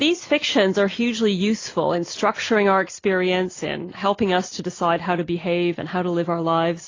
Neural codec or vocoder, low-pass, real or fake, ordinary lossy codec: none; 7.2 kHz; real; AAC, 32 kbps